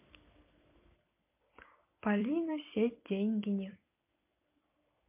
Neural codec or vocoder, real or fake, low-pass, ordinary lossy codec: vocoder, 44.1 kHz, 128 mel bands every 256 samples, BigVGAN v2; fake; 3.6 kHz; MP3, 24 kbps